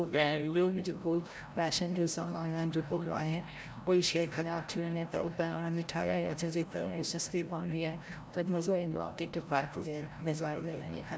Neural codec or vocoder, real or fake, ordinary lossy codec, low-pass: codec, 16 kHz, 0.5 kbps, FreqCodec, larger model; fake; none; none